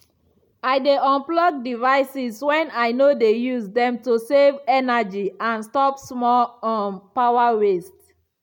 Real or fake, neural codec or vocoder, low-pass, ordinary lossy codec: real; none; 19.8 kHz; none